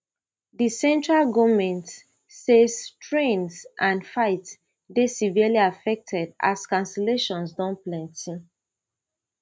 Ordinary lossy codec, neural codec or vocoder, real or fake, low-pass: none; none; real; none